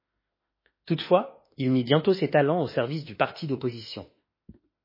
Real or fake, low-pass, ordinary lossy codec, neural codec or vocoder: fake; 5.4 kHz; MP3, 24 kbps; autoencoder, 48 kHz, 32 numbers a frame, DAC-VAE, trained on Japanese speech